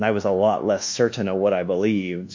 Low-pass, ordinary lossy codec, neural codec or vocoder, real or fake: 7.2 kHz; MP3, 48 kbps; codec, 24 kHz, 1.2 kbps, DualCodec; fake